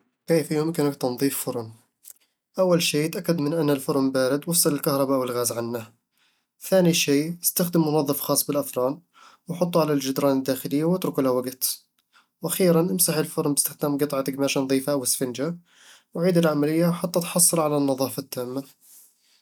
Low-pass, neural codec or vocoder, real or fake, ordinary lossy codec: none; none; real; none